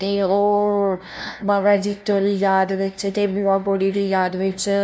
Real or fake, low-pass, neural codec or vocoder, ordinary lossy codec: fake; none; codec, 16 kHz, 0.5 kbps, FunCodec, trained on LibriTTS, 25 frames a second; none